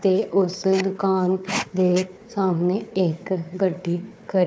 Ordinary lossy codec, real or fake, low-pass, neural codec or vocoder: none; fake; none; codec, 16 kHz, 4 kbps, FunCodec, trained on Chinese and English, 50 frames a second